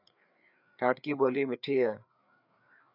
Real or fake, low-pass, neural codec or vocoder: fake; 5.4 kHz; codec, 16 kHz, 4 kbps, FreqCodec, larger model